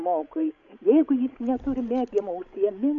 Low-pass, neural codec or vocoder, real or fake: 7.2 kHz; codec, 16 kHz, 16 kbps, FreqCodec, larger model; fake